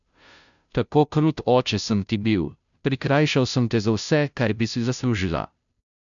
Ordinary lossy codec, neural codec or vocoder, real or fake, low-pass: none; codec, 16 kHz, 0.5 kbps, FunCodec, trained on Chinese and English, 25 frames a second; fake; 7.2 kHz